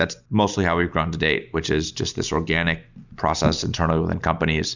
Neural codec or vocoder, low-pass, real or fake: none; 7.2 kHz; real